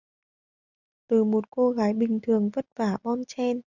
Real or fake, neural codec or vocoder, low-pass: real; none; 7.2 kHz